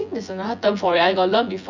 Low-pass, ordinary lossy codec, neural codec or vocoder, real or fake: 7.2 kHz; none; vocoder, 24 kHz, 100 mel bands, Vocos; fake